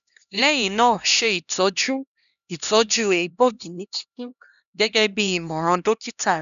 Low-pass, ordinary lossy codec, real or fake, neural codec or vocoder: 7.2 kHz; none; fake; codec, 16 kHz, 1 kbps, X-Codec, HuBERT features, trained on LibriSpeech